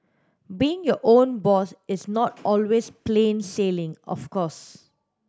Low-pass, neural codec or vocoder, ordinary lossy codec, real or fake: none; none; none; real